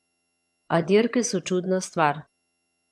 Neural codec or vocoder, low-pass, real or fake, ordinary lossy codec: vocoder, 22.05 kHz, 80 mel bands, HiFi-GAN; none; fake; none